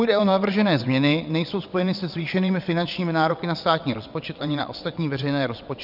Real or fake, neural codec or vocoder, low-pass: fake; vocoder, 22.05 kHz, 80 mel bands, WaveNeXt; 5.4 kHz